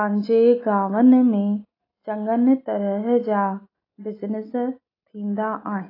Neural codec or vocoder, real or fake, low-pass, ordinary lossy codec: none; real; 5.4 kHz; AAC, 24 kbps